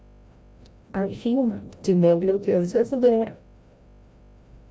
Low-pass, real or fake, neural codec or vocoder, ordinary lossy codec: none; fake; codec, 16 kHz, 0.5 kbps, FreqCodec, larger model; none